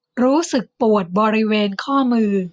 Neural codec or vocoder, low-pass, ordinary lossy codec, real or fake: none; none; none; real